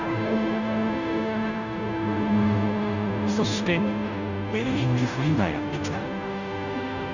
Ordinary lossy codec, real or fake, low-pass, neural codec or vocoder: none; fake; 7.2 kHz; codec, 16 kHz, 0.5 kbps, FunCodec, trained on Chinese and English, 25 frames a second